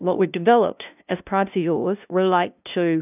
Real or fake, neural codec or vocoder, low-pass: fake; codec, 16 kHz, 0.5 kbps, FunCodec, trained on LibriTTS, 25 frames a second; 3.6 kHz